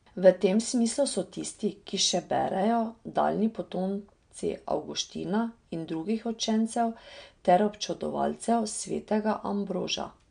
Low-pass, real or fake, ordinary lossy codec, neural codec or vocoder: 9.9 kHz; real; MP3, 64 kbps; none